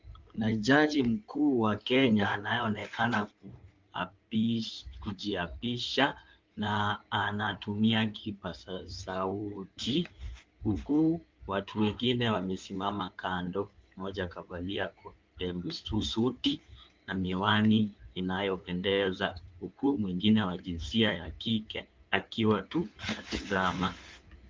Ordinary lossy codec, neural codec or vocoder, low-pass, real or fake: Opus, 24 kbps; codec, 16 kHz in and 24 kHz out, 2.2 kbps, FireRedTTS-2 codec; 7.2 kHz; fake